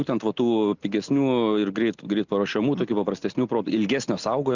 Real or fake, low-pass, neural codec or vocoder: real; 7.2 kHz; none